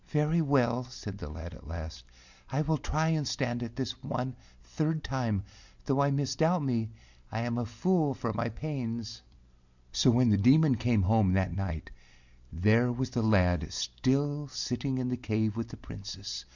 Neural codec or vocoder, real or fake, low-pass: none; real; 7.2 kHz